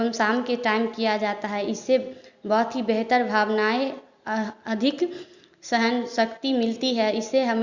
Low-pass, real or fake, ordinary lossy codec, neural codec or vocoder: 7.2 kHz; real; Opus, 64 kbps; none